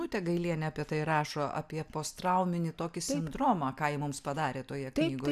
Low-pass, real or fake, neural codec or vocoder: 14.4 kHz; real; none